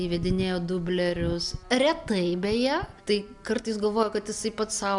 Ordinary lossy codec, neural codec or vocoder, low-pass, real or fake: MP3, 96 kbps; none; 10.8 kHz; real